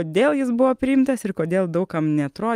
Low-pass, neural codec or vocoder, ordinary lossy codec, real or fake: 14.4 kHz; none; Opus, 32 kbps; real